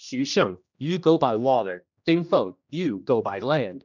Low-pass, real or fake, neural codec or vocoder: 7.2 kHz; fake; codec, 16 kHz, 1 kbps, X-Codec, HuBERT features, trained on general audio